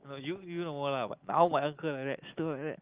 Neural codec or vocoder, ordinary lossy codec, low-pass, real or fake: none; Opus, 32 kbps; 3.6 kHz; real